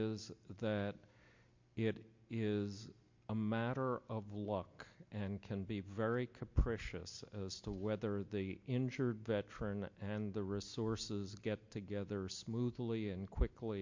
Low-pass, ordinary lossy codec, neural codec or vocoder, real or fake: 7.2 kHz; MP3, 48 kbps; none; real